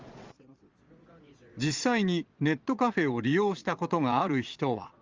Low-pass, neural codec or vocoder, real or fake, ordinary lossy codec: 7.2 kHz; vocoder, 22.05 kHz, 80 mel bands, Vocos; fake; Opus, 32 kbps